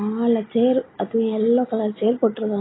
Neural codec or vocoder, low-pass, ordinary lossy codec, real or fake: none; 7.2 kHz; AAC, 16 kbps; real